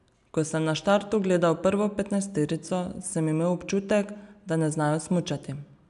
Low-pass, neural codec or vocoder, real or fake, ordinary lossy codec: 10.8 kHz; none; real; none